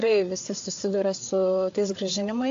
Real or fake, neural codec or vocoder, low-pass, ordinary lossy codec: fake; codec, 16 kHz, 4 kbps, FreqCodec, larger model; 7.2 kHz; AAC, 48 kbps